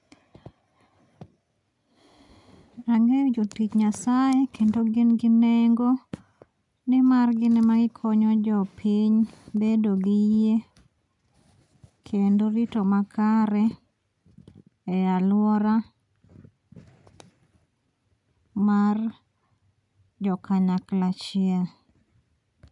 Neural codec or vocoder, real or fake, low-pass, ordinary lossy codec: none; real; 10.8 kHz; none